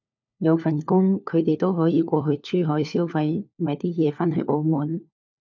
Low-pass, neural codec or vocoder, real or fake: 7.2 kHz; codec, 16 kHz, 4 kbps, FunCodec, trained on LibriTTS, 50 frames a second; fake